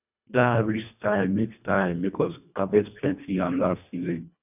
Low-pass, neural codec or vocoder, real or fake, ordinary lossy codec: 3.6 kHz; codec, 24 kHz, 1.5 kbps, HILCodec; fake; none